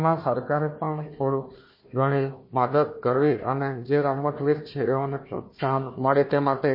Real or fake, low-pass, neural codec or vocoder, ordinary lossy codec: fake; 5.4 kHz; codec, 16 kHz, 1 kbps, FunCodec, trained on Chinese and English, 50 frames a second; MP3, 24 kbps